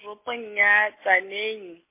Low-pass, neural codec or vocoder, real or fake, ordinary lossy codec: 3.6 kHz; none; real; MP3, 24 kbps